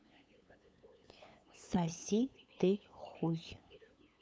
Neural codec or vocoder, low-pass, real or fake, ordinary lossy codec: codec, 16 kHz, 8 kbps, FunCodec, trained on LibriTTS, 25 frames a second; none; fake; none